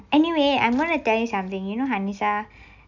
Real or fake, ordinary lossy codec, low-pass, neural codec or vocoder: real; none; 7.2 kHz; none